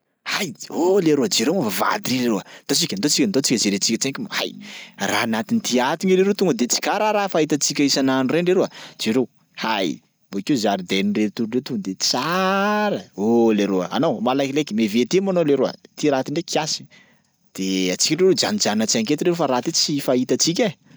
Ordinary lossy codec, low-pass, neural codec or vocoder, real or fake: none; none; none; real